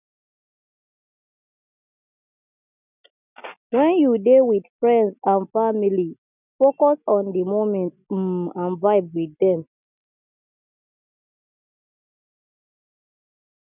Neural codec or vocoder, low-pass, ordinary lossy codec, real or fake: none; 3.6 kHz; none; real